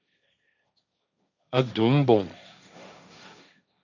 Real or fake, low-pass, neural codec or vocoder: fake; 7.2 kHz; codec, 16 kHz, 1.1 kbps, Voila-Tokenizer